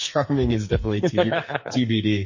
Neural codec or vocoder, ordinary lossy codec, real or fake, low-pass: codec, 44.1 kHz, 2.6 kbps, SNAC; MP3, 32 kbps; fake; 7.2 kHz